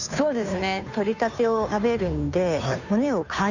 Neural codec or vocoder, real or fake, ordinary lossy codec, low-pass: codec, 16 kHz, 2 kbps, FunCodec, trained on Chinese and English, 25 frames a second; fake; none; 7.2 kHz